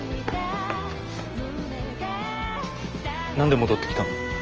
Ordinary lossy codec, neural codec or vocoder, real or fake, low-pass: Opus, 24 kbps; none; real; 7.2 kHz